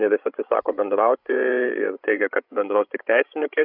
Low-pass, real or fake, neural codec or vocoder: 5.4 kHz; fake; codec, 16 kHz, 16 kbps, FreqCodec, larger model